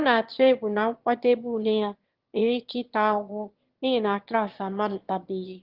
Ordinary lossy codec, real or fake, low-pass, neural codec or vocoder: Opus, 16 kbps; fake; 5.4 kHz; autoencoder, 22.05 kHz, a latent of 192 numbers a frame, VITS, trained on one speaker